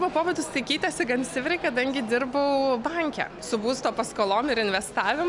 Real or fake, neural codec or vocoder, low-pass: real; none; 10.8 kHz